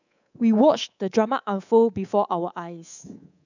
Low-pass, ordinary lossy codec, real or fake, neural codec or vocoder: 7.2 kHz; none; fake; codec, 24 kHz, 3.1 kbps, DualCodec